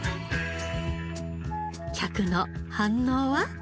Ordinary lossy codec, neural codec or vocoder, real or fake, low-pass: none; none; real; none